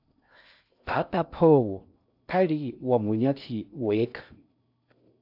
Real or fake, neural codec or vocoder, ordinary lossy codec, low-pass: fake; codec, 16 kHz in and 24 kHz out, 0.6 kbps, FocalCodec, streaming, 4096 codes; AAC, 48 kbps; 5.4 kHz